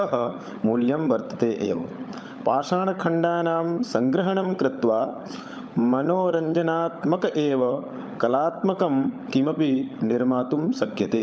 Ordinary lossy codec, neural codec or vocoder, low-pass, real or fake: none; codec, 16 kHz, 16 kbps, FunCodec, trained on LibriTTS, 50 frames a second; none; fake